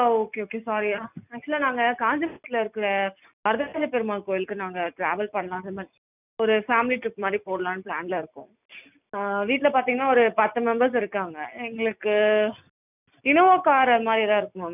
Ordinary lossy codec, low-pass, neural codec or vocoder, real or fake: none; 3.6 kHz; none; real